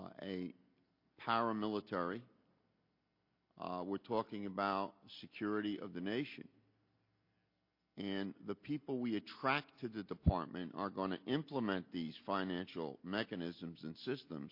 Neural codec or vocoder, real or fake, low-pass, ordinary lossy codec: none; real; 5.4 kHz; MP3, 32 kbps